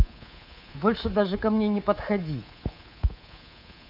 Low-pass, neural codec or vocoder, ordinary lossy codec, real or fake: 5.4 kHz; codec, 24 kHz, 3.1 kbps, DualCodec; none; fake